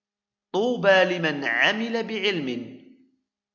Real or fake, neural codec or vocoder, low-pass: real; none; 7.2 kHz